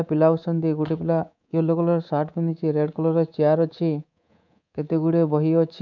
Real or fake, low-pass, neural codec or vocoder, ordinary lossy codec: fake; 7.2 kHz; codec, 24 kHz, 3.1 kbps, DualCodec; none